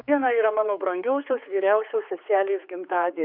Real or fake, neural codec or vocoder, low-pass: fake; codec, 16 kHz, 4 kbps, X-Codec, HuBERT features, trained on general audio; 5.4 kHz